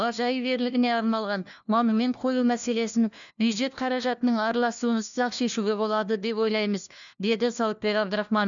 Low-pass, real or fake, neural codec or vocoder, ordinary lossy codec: 7.2 kHz; fake; codec, 16 kHz, 1 kbps, FunCodec, trained on LibriTTS, 50 frames a second; none